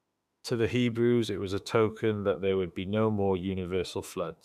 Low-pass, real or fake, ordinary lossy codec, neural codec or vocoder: 14.4 kHz; fake; none; autoencoder, 48 kHz, 32 numbers a frame, DAC-VAE, trained on Japanese speech